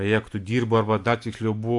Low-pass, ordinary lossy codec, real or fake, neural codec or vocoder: 10.8 kHz; AAC, 64 kbps; real; none